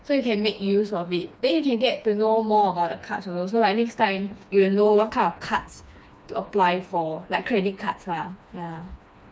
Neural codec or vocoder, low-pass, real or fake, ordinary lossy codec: codec, 16 kHz, 2 kbps, FreqCodec, smaller model; none; fake; none